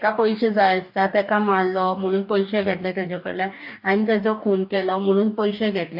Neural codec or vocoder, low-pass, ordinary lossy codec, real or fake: codec, 44.1 kHz, 2.6 kbps, DAC; 5.4 kHz; AAC, 48 kbps; fake